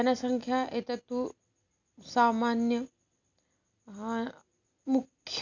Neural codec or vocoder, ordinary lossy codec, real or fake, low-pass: none; none; real; 7.2 kHz